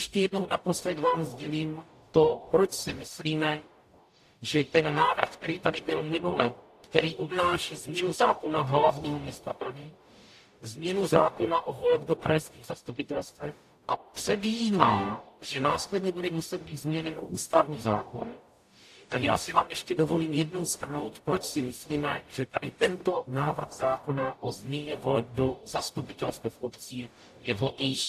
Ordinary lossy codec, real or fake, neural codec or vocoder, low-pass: AAC, 64 kbps; fake; codec, 44.1 kHz, 0.9 kbps, DAC; 14.4 kHz